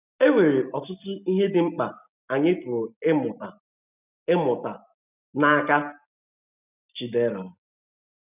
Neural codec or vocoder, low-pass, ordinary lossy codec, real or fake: none; 3.6 kHz; none; real